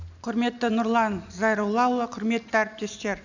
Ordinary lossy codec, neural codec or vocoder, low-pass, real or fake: none; none; 7.2 kHz; real